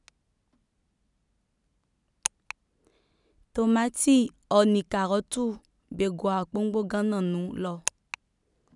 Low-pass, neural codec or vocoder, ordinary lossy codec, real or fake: 10.8 kHz; none; none; real